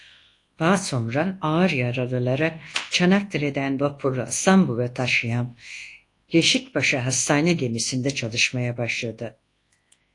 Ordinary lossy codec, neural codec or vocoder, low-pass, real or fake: AAC, 48 kbps; codec, 24 kHz, 0.9 kbps, WavTokenizer, large speech release; 10.8 kHz; fake